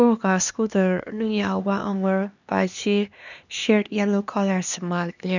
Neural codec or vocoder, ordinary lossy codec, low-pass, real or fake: codec, 16 kHz, 2 kbps, X-Codec, HuBERT features, trained on LibriSpeech; none; 7.2 kHz; fake